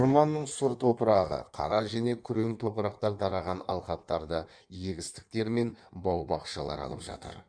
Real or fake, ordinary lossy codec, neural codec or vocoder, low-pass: fake; none; codec, 16 kHz in and 24 kHz out, 1.1 kbps, FireRedTTS-2 codec; 9.9 kHz